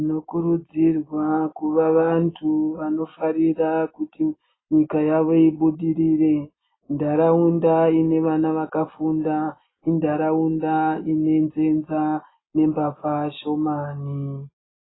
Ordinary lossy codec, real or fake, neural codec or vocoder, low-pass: AAC, 16 kbps; real; none; 7.2 kHz